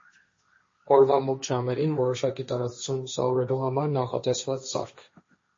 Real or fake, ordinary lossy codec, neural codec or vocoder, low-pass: fake; MP3, 32 kbps; codec, 16 kHz, 1.1 kbps, Voila-Tokenizer; 7.2 kHz